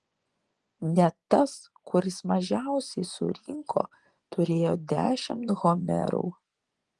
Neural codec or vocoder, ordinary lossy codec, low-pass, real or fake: vocoder, 24 kHz, 100 mel bands, Vocos; Opus, 24 kbps; 10.8 kHz; fake